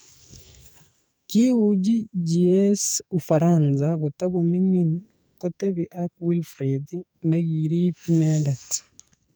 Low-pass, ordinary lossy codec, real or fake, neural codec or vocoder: none; none; fake; codec, 44.1 kHz, 2.6 kbps, SNAC